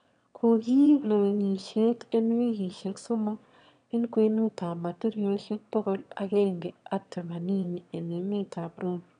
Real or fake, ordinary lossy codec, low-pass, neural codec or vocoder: fake; none; 9.9 kHz; autoencoder, 22.05 kHz, a latent of 192 numbers a frame, VITS, trained on one speaker